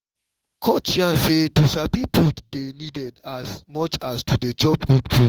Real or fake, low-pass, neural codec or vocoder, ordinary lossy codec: fake; 19.8 kHz; autoencoder, 48 kHz, 32 numbers a frame, DAC-VAE, trained on Japanese speech; Opus, 24 kbps